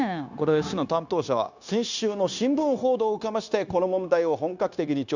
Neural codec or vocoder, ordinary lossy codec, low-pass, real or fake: codec, 16 kHz, 0.9 kbps, LongCat-Audio-Codec; none; 7.2 kHz; fake